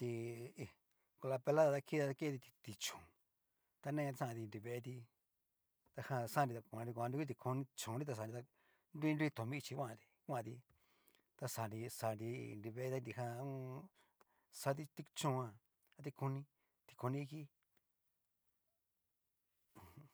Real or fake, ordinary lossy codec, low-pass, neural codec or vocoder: real; none; none; none